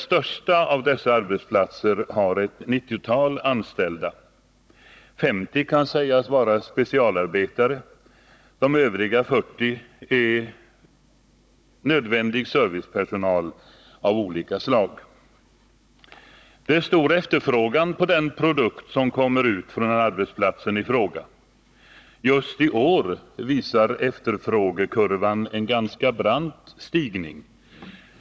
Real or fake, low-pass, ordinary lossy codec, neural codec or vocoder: fake; none; none; codec, 16 kHz, 16 kbps, FunCodec, trained on Chinese and English, 50 frames a second